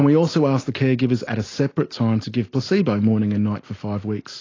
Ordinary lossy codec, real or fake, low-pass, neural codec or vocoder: AAC, 32 kbps; real; 7.2 kHz; none